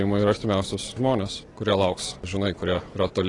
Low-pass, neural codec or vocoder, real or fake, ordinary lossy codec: 10.8 kHz; none; real; AAC, 32 kbps